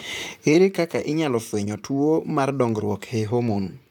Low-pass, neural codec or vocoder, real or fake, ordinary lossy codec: 19.8 kHz; vocoder, 44.1 kHz, 128 mel bands, Pupu-Vocoder; fake; none